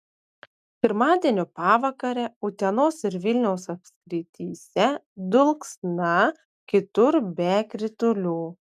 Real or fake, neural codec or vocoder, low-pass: real; none; 14.4 kHz